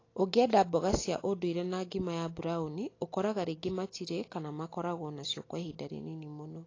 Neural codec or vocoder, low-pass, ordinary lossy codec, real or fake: none; 7.2 kHz; AAC, 32 kbps; real